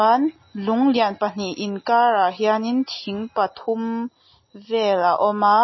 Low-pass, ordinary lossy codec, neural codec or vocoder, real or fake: 7.2 kHz; MP3, 24 kbps; none; real